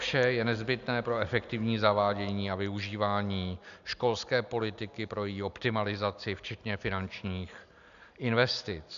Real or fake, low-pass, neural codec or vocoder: real; 7.2 kHz; none